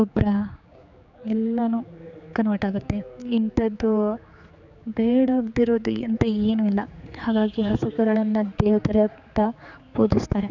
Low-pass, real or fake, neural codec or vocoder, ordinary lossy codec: 7.2 kHz; fake; codec, 16 kHz, 4 kbps, X-Codec, HuBERT features, trained on general audio; none